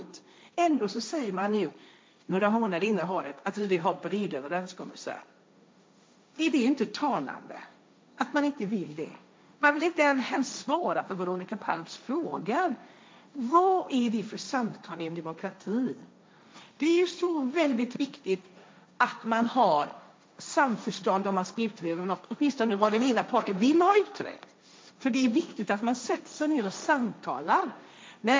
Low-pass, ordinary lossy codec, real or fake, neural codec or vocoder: none; none; fake; codec, 16 kHz, 1.1 kbps, Voila-Tokenizer